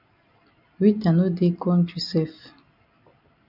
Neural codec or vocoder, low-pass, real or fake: none; 5.4 kHz; real